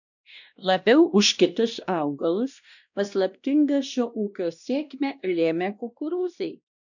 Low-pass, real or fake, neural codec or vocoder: 7.2 kHz; fake; codec, 16 kHz, 1 kbps, X-Codec, WavLM features, trained on Multilingual LibriSpeech